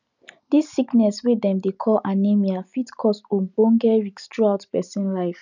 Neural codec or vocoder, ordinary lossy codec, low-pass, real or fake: none; none; 7.2 kHz; real